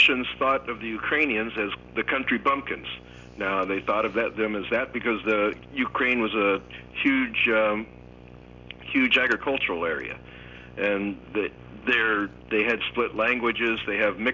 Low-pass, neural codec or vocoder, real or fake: 7.2 kHz; none; real